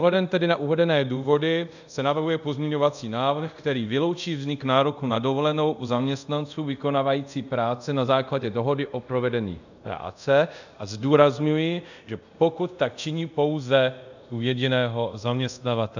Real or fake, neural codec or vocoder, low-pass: fake; codec, 24 kHz, 0.5 kbps, DualCodec; 7.2 kHz